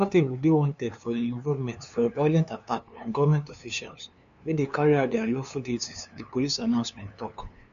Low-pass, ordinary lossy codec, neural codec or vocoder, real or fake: 7.2 kHz; none; codec, 16 kHz, 2 kbps, FunCodec, trained on LibriTTS, 25 frames a second; fake